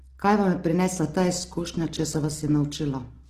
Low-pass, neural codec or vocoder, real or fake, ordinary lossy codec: 14.4 kHz; none; real; Opus, 16 kbps